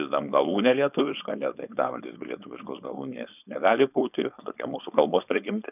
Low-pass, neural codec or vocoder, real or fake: 3.6 kHz; codec, 16 kHz, 4.8 kbps, FACodec; fake